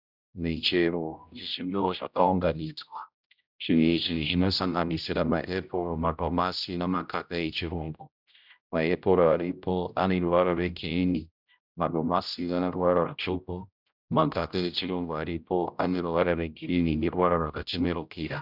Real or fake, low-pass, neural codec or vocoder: fake; 5.4 kHz; codec, 16 kHz, 0.5 kbps, X-Codec, HuBERT features, trained on general audio